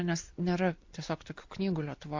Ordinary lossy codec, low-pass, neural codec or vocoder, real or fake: MP3, 48 kbps; 7.2 kHz; none; real